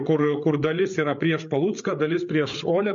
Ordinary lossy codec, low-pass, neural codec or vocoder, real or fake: MP3, 48 kbps; 7.2 kHz; codec, 16 kHz, 8 kbps, FreqCodec, larger model; fake